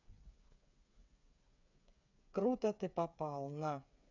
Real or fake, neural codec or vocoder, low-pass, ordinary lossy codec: fake; codec, 16 kHz, 8 kbps, FreqCodec, smaller model; 7.2 kHz; none